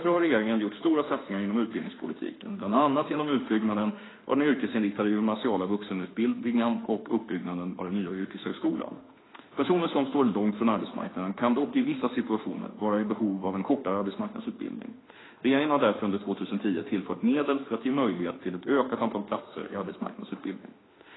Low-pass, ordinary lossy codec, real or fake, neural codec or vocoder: 7.2 kHz; AAC, 16 kbps; fake; autoencoder, 48 kHz, 32 numbers a frame, DAC-VAE, trained on Japanese speech